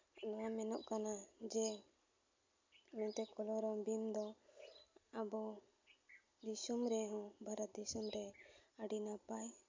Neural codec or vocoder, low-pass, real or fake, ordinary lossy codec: none; 7.2 kHz; real; MP3, 64 kbps